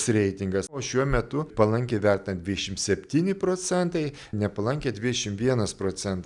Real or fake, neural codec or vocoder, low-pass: real; none; 10.8 kHz